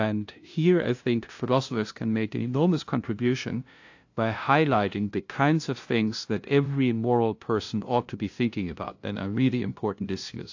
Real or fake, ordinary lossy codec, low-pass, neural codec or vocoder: fake; AAC, 48 kbps; 7.2 kHz; codec, 16 kHz, 0.5 kbps, FunCodec, trained on LibriTTS, 25 frames a second